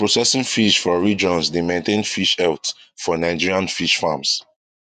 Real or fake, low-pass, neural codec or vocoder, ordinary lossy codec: fake; 14.4 kHz; vocoder, 48 kHz, 128 mel bands, Vocos; none